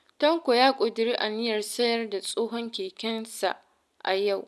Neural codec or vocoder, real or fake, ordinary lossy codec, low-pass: none; real; none; none